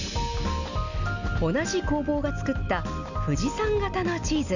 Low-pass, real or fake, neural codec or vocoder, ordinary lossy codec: 7.2 kHz; real; none; none